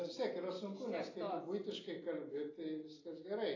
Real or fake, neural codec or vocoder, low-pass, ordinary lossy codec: real; none; 7.2 kHz; AAC, 32 kbps